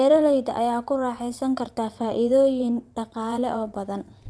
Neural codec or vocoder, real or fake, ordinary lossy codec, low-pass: vocoder, 22.05 kHz, 80 mel bands, WaveNeXt; fake; none; none